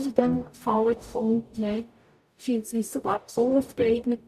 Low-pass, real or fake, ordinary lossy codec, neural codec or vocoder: 14.4 kHz; fake; none; codec, 44.1 kHz, 0.9 kbps, DAC